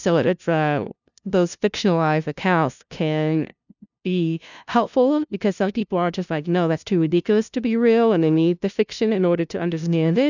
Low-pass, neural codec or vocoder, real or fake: 7.2 kHz; codec, 16 kHz, 0.5 kbps, FunCodec, trained on LibriTTS, 25 frames a second; fake